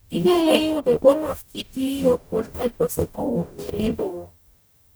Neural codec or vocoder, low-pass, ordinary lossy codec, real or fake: codec, 44.1 kHz, 0.9 kbps, DAC; none; none; fake